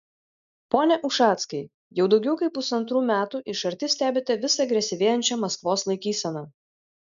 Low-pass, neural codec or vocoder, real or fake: 7.2 kHz; none; real